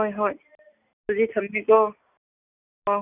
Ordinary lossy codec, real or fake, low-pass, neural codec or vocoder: none; real; 3.6 kHz; none